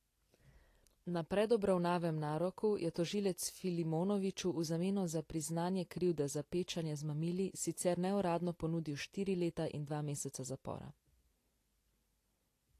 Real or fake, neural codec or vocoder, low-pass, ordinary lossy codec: real; none; 14.4 kHz; AAC, 48 kbps